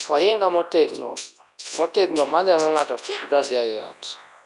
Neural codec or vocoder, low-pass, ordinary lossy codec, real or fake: codec, 24 kHz, 0.9 kbps, WavTokenizer, large speech release; 10.8 kHz; none; fake